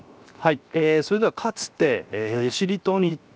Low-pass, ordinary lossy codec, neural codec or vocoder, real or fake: none; none; codec, 16 kHz, 0.7 kbps, FocalCodec; fake